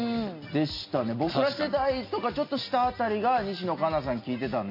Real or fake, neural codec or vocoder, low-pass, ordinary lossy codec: real; none; 5.4 kHz; MP3, 32 kbps